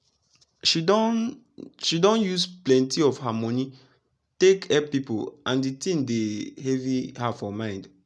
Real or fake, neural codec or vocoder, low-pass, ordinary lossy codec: real; none; none; none